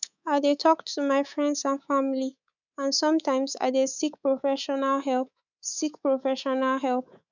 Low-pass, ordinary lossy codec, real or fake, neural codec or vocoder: 7.2 kHz; none; fake; codec, 24 kHz, 3.1 kbps, DualCodec